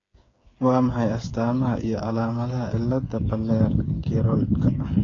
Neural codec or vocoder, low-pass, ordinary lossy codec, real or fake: codec, 16 kHz, 8 kbps, FreqCodec, smaller model; 7.2 kHz; AAC, 32 kbps; fake